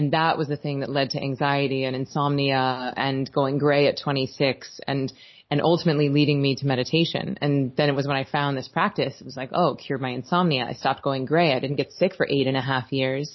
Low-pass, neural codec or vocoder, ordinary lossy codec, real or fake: 7.2 kHz; none; MP3, 24 kbps; real